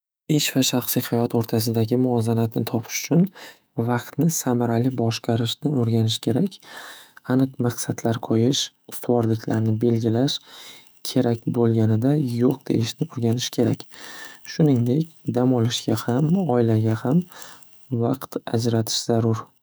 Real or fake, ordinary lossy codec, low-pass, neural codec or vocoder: fake; none; none; autoencoder, 48 kHz, 128 numbers a frame, DAC-VAE, trained on Japanese speech